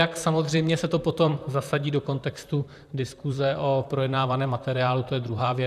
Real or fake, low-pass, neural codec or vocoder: fake; 14.4 kHz; vocoder, 44.1 kHz, 128 mel bands, Pupu-Vocoder